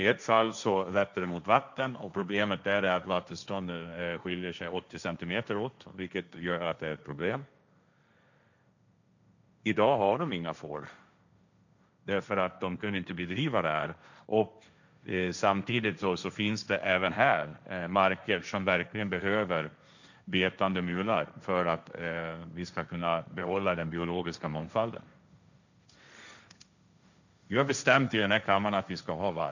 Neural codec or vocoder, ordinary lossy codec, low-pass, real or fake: codec, 16 kHz, 1.1 kbps, Voila-Tokenizer; none; 7.2 kHz; fake